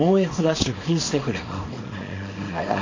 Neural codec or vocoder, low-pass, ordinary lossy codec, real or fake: codec, 24 kHz, 0.9 kbps, WavTokenizer, small release; 7.2 kHz; MP3, 32 kbps; fake